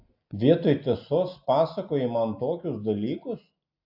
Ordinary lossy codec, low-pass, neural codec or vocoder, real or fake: AAC, 48 kbps; 5.4 kHz; none; real